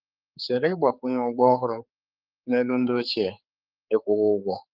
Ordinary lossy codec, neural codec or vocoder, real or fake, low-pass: Opus, 24 kbps; codec, 16 kHz, 4 kbps, X-Codec, HuBERT features, trained on general audio; fake; 5.4 kHz